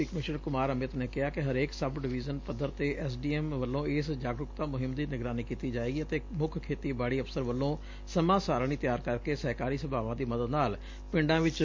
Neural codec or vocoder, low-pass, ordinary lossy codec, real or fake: none; 7.2 kHz; MP3, 48 kbps; real